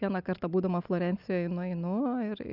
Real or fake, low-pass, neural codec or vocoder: real; 5.4 kHz; none